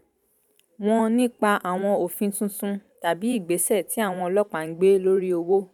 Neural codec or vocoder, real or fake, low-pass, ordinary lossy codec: vocoder, 44.1 kHz, 128 mel bands every 512 samples, BigVGAN v2; fake; 19.8 kHz; none